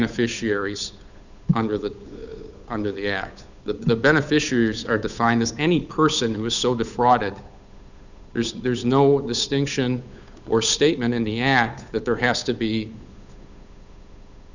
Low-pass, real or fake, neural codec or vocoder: 7.2 kHz; fake; codec, 16 kHz, 8 kbps, FunCodec, trained on Chinese and English, 25 frames a second